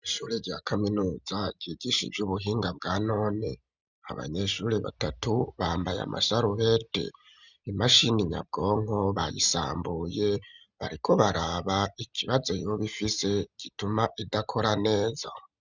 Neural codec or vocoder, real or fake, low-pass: none; real; 7.2 kHz